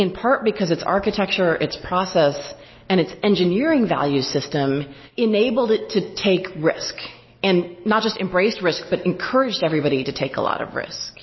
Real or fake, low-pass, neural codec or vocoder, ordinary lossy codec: real; 7.2 kHz; none; MP3, 24 kbps